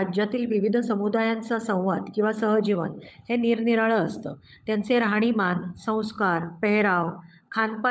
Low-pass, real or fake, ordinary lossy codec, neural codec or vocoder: none; fake; none; codec, 16 kHz, 16 kbps, FunCodec, trained on LibriTTS, 50 frames a second